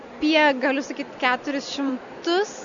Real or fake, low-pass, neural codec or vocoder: real; 7.2 kHz; none